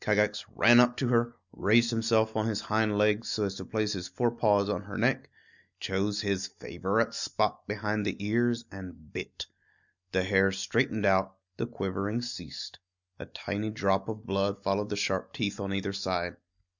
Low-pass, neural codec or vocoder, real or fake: 7.2 kHz; none; real